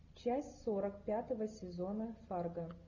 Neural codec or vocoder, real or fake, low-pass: none; real; 7.2 kHz